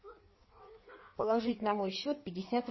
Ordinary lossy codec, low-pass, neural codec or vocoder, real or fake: MP3, 24 kbps; 7.2 kHz; codec, 16 kHz in and 24 kHz out, 1.1 kbps, FireRedTTS-2 codec; fake